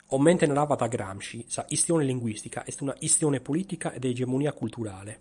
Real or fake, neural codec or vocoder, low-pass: fake; vocoder, 44.1 kHz, 128 mel bands every 256 samples, BigVGAN v2; 10.8 kHz